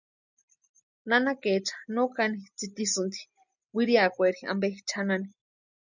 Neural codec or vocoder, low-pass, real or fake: none; 7.2 kHz; real